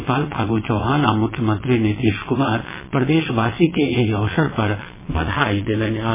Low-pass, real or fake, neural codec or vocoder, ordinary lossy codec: 3.6 kHz; fake; vocoder, 22.05 kHz, 80 mel bands, WaveNeXt; MP3, 16 kbps